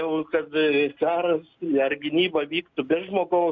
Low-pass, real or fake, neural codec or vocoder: 7.2 kHz; real; none